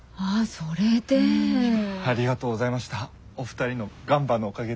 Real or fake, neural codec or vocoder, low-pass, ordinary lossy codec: real; none; none; none